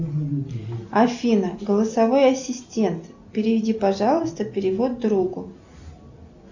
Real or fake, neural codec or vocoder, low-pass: real; none; 7.2 kHz